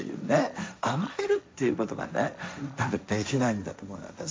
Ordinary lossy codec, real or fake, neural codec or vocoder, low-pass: none; fake; codec, 16 kHz, 1.1 kbps, Voila-Tokenizer; none